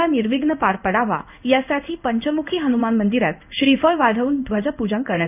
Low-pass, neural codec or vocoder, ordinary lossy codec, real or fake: 3.6 kHz; codec, 16 kHz in and 24 kHz out, 1 kbps, XY-Tokenizer; none; fake